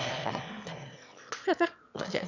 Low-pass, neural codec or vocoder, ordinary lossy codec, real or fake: 7.2 kHz; autoencoder, 22.05 kHz, a latent of 192 numbers a frame, VITS, trained on one speaker; none; fake